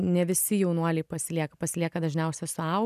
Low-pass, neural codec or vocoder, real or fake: 14.4 kHz; none; real